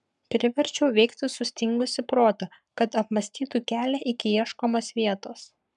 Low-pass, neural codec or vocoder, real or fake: 10.8 kHz; codec, 44.1 kHz, 7.8 kbps, Pupu-Codec; fake